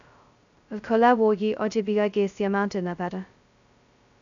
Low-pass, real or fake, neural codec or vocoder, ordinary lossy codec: 7.2 kHz; fake; codec, 16 kHz, 0.2 kbps, FocalCodec; none